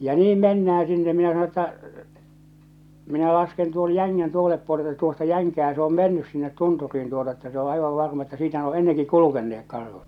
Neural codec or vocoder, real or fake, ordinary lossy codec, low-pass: none; real; none; 19.8 kHz